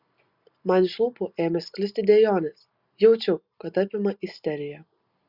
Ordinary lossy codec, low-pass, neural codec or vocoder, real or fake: Opus, 64 kbps; 5.4 kHz; none; real